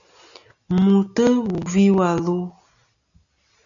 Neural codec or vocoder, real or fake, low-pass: none; real; 7.2 kHz